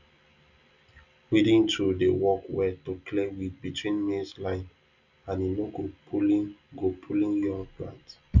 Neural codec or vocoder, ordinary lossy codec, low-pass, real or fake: none; none; 7.2 kHz; real